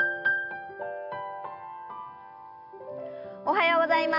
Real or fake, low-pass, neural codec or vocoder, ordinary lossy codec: real; 5.4 kHz; none; none